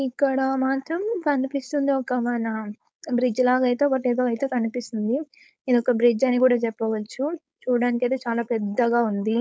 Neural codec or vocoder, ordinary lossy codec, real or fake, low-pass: codec, 16 kHz, 4.8 kbps, FACodec; none; fake; none